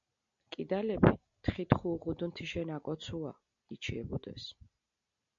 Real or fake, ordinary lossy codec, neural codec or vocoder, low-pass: real; MP3, 64 kbps; none; 7.2 kHz